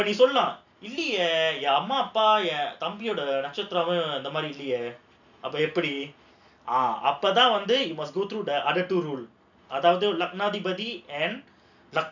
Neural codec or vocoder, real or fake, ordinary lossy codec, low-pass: none; real; none; 7.2 kHz